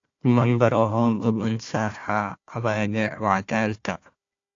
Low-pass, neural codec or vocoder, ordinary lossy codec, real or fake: 7.2 kHz; codec, 16 kHz, 1 kbps, FunCodec, trained on Chinese and English, 50 frames a second; AAC, 48 kbps; fake